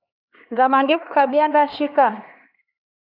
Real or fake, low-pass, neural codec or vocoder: fake; 5.4 kHz; codec, 16 kHz, 2 kbps, X-Codec, HuBERT features, trained on LibriSpeech